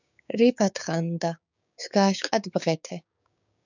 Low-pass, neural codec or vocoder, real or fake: 7.2 kHz; codec, 16 kHz, 6 kbps, DAC; fake